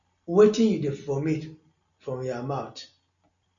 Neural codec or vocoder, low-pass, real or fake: none; 7.2 kHz; real